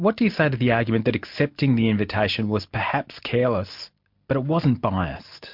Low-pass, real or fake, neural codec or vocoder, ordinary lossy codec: 5.4 kHz; real; none; MP3, 48 kbps